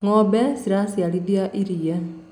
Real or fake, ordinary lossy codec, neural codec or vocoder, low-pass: real; none; none; 19.8 kHz